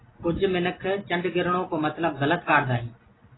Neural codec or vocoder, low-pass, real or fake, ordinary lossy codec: none; 7.2 kHz; real; AAC, 16 kbps